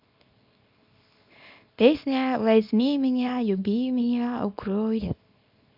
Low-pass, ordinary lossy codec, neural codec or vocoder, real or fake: 5.4 kHz; none; codec, 24 kHz, 0.9 kbps, WavTokenizer, small release; fake